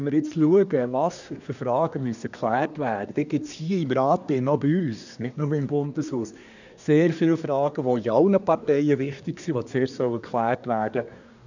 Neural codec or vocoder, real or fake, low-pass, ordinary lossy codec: codec, 24 kHz, 1 kbps, SNAC; fake; 7.2 kHz; none